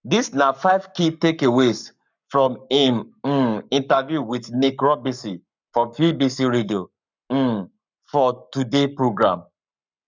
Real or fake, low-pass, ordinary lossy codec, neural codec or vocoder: fake; 7.2 kHz; none; codec, 44.1 kHz, 7.8 kbps, Pupu-Codec